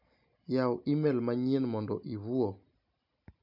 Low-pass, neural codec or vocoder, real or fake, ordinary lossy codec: 5.4 kHz; none; real; MP3, 48 kbps